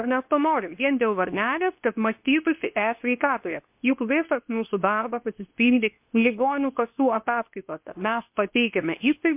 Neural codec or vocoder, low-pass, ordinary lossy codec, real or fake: codec, 24 kHz, 0.9 kbps, WavTokenizer, medium speech release version 2; 3.6 kHz; MP3, 32 kbps; fake